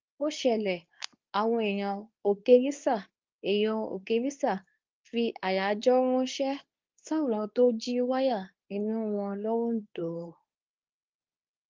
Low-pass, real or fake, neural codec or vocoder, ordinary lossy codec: 7.2 kHz; fake; codec, 24 kHz, 0.9 kbps, WavTokenizer, medium speech release version 2; Opus, 24 kbps